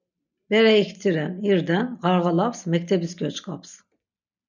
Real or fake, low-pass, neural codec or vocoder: real; 7.2 kHz; none